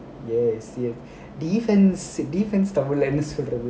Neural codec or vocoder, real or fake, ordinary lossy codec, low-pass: none; real; none; none